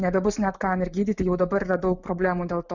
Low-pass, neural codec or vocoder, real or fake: 7.2 kHz; none; real